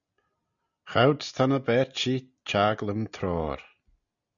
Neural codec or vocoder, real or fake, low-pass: none; real; 7.2 kHz